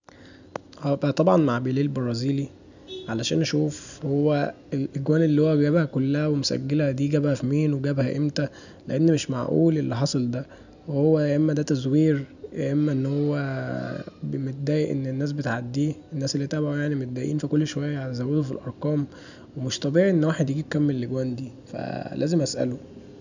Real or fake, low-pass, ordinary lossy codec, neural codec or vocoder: real; 7.2 kHz; none; none